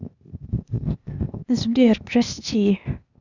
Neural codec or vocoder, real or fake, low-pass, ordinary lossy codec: codec, 16 kHz, 0.8 kbps, ZipCodec; fake; 7.2 kHz; none